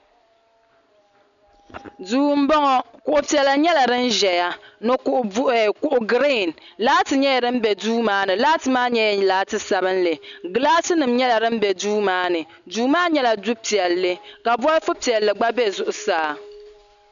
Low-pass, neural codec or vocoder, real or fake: 7.2 kHz; none; real